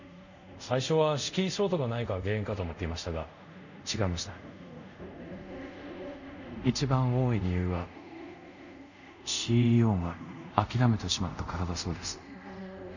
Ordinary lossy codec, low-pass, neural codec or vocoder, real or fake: none; 7.2 kHz; codec, 24 kHz, 0.5 kbps, DualCodec; fake